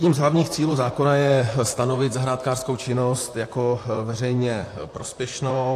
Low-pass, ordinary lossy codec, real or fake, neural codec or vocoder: 14.4 kHz; AAC, 64 kbps; fake; vocoder, 44.1 kHz, 128 mel bands, Pupu-Vocoder